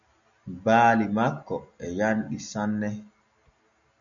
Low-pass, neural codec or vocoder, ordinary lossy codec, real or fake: 7.2 kHz; none; MP3, 96 kbps; real